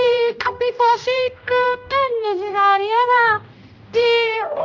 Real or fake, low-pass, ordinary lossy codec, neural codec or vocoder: fake; 7.2 kHz; none; codec, 16 kHz, 0.5 kbps, X-Codec, HuBERT features, trained on general audio